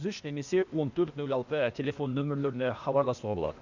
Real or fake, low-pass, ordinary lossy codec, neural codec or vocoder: fake; 7.2 kHz; Opus, 64 kbps; codec, 16 kHz, 0.8 kbps, ZipCodec